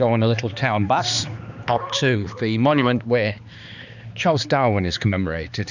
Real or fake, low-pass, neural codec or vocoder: fake; 7.2 kHz; codec, 16 kHz, 2 kbps, X-Codec, HuBERT features, trained on balanced general audio